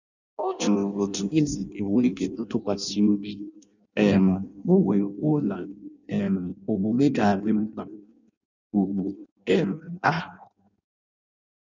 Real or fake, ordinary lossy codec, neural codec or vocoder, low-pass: fake; none; codec, 16 kHz in and 24 kHz out, 0.6 kbps, FireRedTTS-2 codec; 7.2 kHz